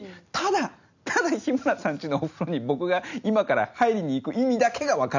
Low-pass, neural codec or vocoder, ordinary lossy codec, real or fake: 7.2 kHz; none; none; real